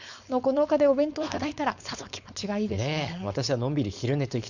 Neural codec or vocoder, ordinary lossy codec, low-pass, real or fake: codec, 16 kHz, 4.8 kbps, FACodec; none; 7.2 kHz; fake